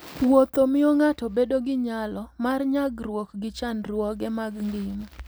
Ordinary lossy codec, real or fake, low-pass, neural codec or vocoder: none; real; none; none